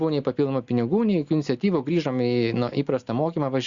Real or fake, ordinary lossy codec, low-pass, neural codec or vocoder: real; Opus, 64 kbps; 7.2 kHz; none